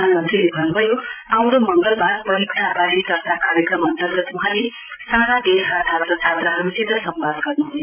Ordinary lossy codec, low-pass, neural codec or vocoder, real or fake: none; 3.6 kHz; codec, 16 kHz, 16 kbps, FreqCodec, larger model; fake